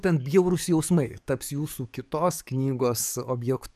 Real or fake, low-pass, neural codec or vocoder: fake; 14.4 kHz; codec, 44.1 kHz, 7.8 kbps, DAC